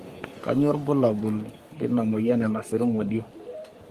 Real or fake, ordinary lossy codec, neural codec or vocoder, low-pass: fake; Opus, 32 kbps; codec, 44.1 kHz, 3.4 kbps, Pupu-Codec; 14.4 kHz